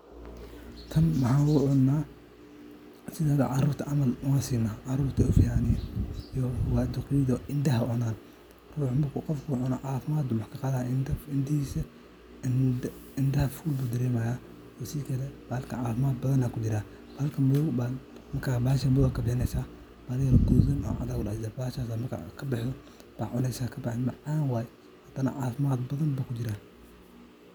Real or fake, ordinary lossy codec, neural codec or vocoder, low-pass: real; none; none; none